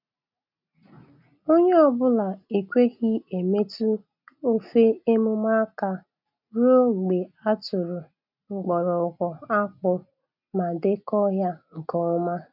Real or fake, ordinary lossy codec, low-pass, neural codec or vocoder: real; none; 5.4 kHz; none